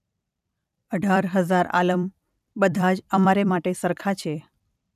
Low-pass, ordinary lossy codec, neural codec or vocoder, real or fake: 14.4 kHz; none; vocoder, 44.1 kHz, 128 mel bands every 256 samples, BigVGAN v2; fake